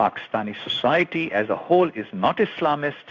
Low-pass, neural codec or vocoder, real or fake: 7.2 kHz; codec, 16 kHz in and 24 kHz out, 1 kbps, XY-Tokenizer; fake